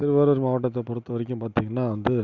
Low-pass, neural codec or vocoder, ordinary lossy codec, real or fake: 7.2 kHz; none; none; real